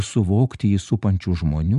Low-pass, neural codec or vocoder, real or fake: 10.8 kHz; none; real